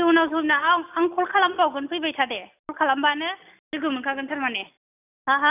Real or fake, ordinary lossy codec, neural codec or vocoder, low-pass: real; none; none; 3.6 kHz